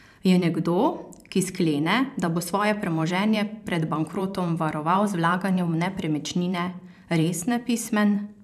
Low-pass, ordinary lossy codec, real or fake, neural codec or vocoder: 14.4 kHz; none; fake; vocoder, 44.1 kHz, 128 mel bands every 512 samples, BigVGAN v2